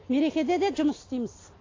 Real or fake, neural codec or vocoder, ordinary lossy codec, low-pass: real; none; AAC, 32 kbps; 7.2 kHz